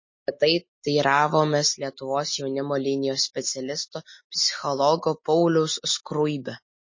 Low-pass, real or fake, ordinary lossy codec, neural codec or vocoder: 7.2 kHz; real; MP3, 32 kbps; none